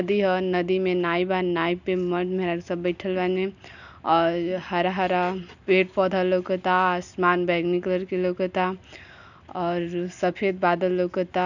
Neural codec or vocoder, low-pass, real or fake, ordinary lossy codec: none; 7.2 kHz; real; none